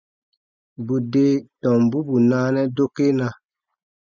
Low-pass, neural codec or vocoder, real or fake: 7.2 kHz; none; real